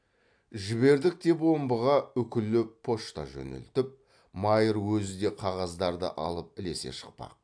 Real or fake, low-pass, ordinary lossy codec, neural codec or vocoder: real; 9.9 kHz; AAC, 64 kbps; none